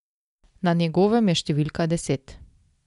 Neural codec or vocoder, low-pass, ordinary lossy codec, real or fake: none; 10.8 kHz; none; real